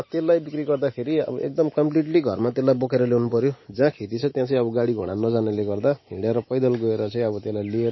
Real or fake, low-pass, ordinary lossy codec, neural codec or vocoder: real; 7.2 kHz; MP3, 24 kbps; none